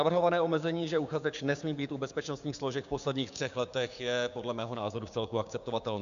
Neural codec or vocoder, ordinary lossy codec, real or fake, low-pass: codec, 16 kHz, 6 kbps, DAC; AAC, 96 kbps; fake; 7.2 kHz